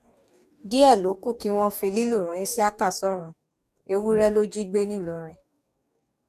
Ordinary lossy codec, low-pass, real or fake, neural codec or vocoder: none; 14.4 kHz; fake; codec, 44.1 kHz, 2.6 kbps, DAC